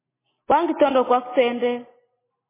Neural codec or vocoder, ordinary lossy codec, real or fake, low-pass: none; MP3, 16 kbps; real; 3.6 kHz